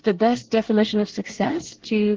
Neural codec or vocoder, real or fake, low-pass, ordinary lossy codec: codec, 24 kHz, 1 kbps, SNAC; fake; 7.2 kHz; Opus, 16 kbps